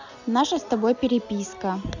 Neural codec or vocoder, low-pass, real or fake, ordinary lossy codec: none; 7.2 kHz; real; none